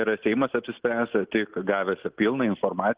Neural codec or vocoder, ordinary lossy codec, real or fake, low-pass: none; Opus, 16 kbps; real; 3.6 kHz